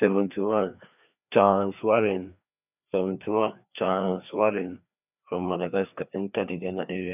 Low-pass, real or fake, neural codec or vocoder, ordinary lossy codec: 3.6 kHz; fake; codec, 16 kHz, 2 kbps, FreqCodec, larger model; none